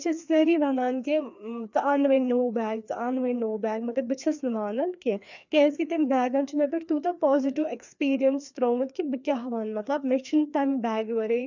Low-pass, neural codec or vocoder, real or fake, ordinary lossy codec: 7.2 kHz; codec, 16 kHz, 2 kbps, FreqCodec, larger model; fake; none